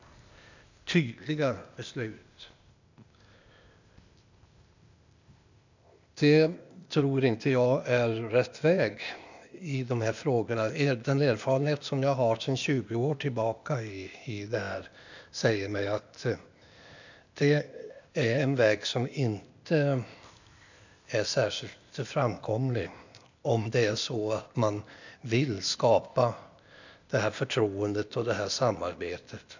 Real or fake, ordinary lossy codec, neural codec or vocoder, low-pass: fake; none; codec, 16 kHz, 0.8 kbps, ZipCodec; 7.2 kHz